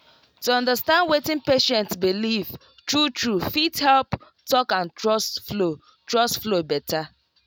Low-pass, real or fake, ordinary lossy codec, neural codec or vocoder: none; real; none; none